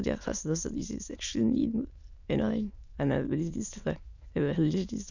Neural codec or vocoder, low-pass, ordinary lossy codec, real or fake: autoencoder, 22.05 kHz, a latent of 192 numbers a frame, VITS, trained on many speakers; 7.2 kHz; none; fake